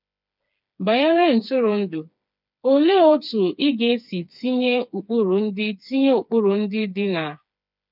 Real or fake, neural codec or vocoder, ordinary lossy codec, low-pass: fake; codec, 16 kHz, 4 kbps, FreqCodec, smaller model; none; 5.4 kHz